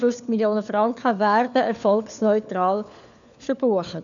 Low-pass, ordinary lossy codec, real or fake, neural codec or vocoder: 7.2 kHz; none; fake; codec, 16 kHz, 4 kbps, FunCodec, trained on LibriTTS, 50 frames a second